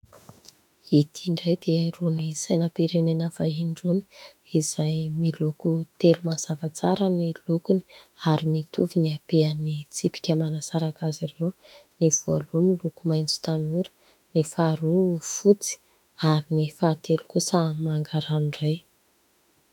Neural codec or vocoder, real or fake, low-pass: autoencoder, 48 kHz, 32 numbers a frame, DAC-VAE, trained on Japanese speech; fake; 19.8 kHz